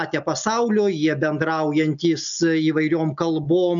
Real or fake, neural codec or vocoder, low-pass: real; none; 7.2 kHz